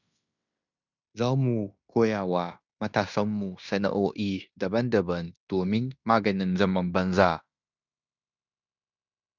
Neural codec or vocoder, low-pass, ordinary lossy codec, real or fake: codec, 16 kHz in and 24 kHz out, 0.9 kbps, LongCat-Audio-Codec, fine tuned four codebook decoder; 7.2 kHz; none; fake